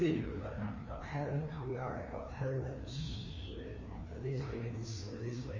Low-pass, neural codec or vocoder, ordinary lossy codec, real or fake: 7.2 kHz; codec, 16 kHz, 2 kbps, FreqCodec, larger model; MP3, 32 kbps; fake